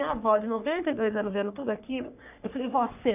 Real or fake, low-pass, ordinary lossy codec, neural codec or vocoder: fake; 3.6 kHz; none; codec, 44.1 kHz, 3.4 kbps, Pupu-Codec